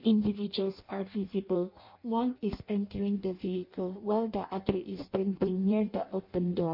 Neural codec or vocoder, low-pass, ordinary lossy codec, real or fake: codec, 16 kHz in and 24 kHz out, 0.6 kbps, FireRedTTS-2 codec; 5.4 kHz; MP3, 32 kbps; fake